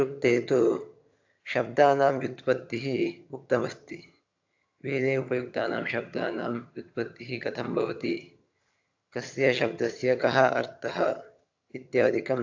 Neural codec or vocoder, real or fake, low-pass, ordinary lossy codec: vocoder, 22.05 kHz, 80 mel bands, HiFi-GAN; fake; 7.2 kHz; AAC, 48 kbps